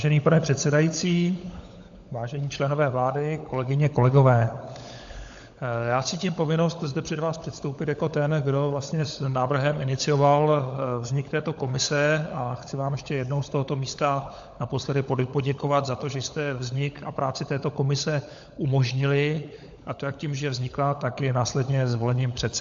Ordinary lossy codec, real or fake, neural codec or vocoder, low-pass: AAC, 64 kbps; fake; codec, 16 kHz, 16 kbps, FunCodec, trained on LibriTTS, 50 frames a second; 7.2 kHz